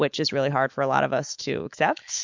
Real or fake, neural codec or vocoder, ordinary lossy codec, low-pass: fake; autoencoder, 48 kHz, 128 numbers a frame, DAC-VAE, trained on Japanese speech; MP3, 64 kbps; 7.2 kHz